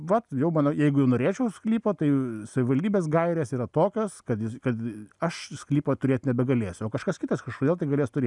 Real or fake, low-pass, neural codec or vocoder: real; 10.8 kHz; none